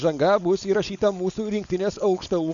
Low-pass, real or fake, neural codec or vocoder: 7.2 kHz; fake; codec, 16 kHz, 4.8 kbps, FACodec